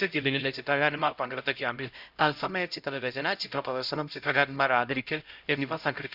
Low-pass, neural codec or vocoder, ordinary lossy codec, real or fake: 5.4 kHz; codec, 16 kHz, 0.5 kbps, X-Codec, HuBERT features, trained on LibriSpeech; Opus, 64 kbps; fake